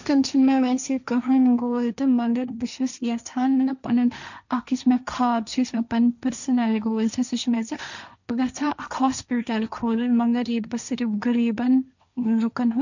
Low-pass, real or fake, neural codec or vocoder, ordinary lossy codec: 7.2 kHz; fake; codec, 16 kHz, 1.1 kbps, Voila-Tokenizer; none